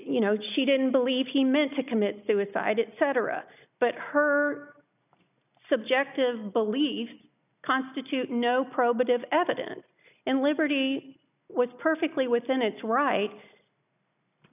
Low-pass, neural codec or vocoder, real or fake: 3.6 kHz; none; real